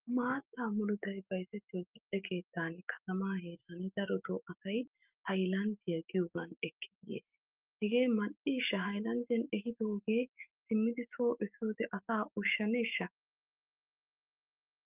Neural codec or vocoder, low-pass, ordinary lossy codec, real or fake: autoencoder, 48 kHz, 128 numbers a frame, DAC-VAE, trained on Japanese speech; 3.6 kHz; Opus, 32 kbps; fake